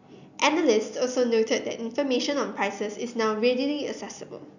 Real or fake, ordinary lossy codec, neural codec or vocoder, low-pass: real; none; none; 7.2 kHz